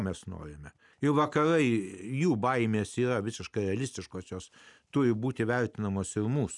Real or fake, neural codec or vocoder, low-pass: real; none; 10.8 kHz